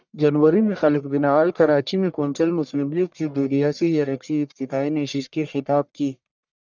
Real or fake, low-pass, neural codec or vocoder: fake; 7.2 kHz; codec, 44.1 kHz, 1.7 kbps, Pupu-Codec